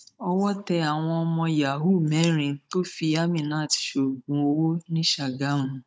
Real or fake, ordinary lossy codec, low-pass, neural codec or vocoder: fake; none; none; codec, 16 kHz, 16 kbps, FunCodec, trained on Chinese and English, 50 frames a second